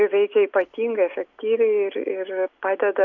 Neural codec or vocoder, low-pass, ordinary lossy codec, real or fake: none; 7.2 kHz; MP3, 64 kbps; real